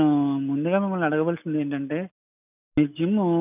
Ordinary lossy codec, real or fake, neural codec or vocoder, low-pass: none; real; none; 3.6 kHz